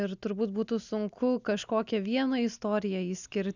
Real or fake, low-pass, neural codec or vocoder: real; 7.2 kHz; none